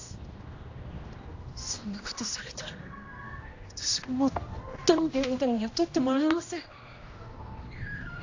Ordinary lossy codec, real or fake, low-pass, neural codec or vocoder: AAC, 48 kbps; fake; 7.2 kHz; codec, 16 kHz, 1 kbps, X-Codec, HuBERT features, trained on general audio